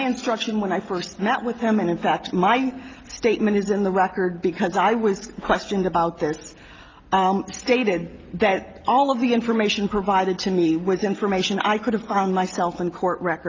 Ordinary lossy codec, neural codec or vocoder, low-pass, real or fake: Opus, 32 kbps; none; 7.2 kHz; real